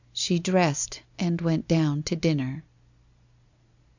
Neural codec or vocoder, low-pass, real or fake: none; 7.2 kHz; real